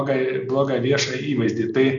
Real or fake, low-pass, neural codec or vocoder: real; 7.2 kHz; none